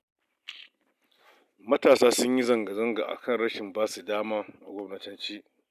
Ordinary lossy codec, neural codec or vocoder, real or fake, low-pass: none; none; real; 14.4 kHz